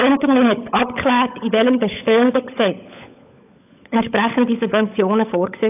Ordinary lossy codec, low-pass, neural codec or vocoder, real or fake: AAC, 32 kbps; 3.6 kHz; codec, 16 kHz, 16 kbps, FunCodec, trained on LibriTTS, 50 frames a second; fake